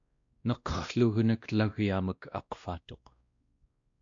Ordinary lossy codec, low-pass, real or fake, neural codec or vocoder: AAC, 64 kbps; 7.2 kHz; fake; codec, 16 kHz, 1 kbps, X-Codec, WavLM features, trained on Multilingual LibriSpeech